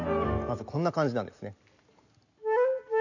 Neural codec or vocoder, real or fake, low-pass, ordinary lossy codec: none; real; 7.2 kHz; none